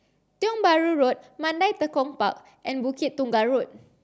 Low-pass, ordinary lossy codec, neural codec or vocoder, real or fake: none; none; none; real